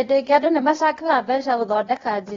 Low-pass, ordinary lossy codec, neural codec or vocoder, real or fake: 7.2 kHz; AAC, 24 kbps; codec, 16 kHz, 0.8 kbps, ZipCodec; fake